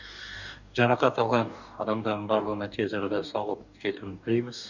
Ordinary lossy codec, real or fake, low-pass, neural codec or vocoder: none; fake; 7.2 kHz; codec, 44.1 kHz, 2.6 kbps, DAC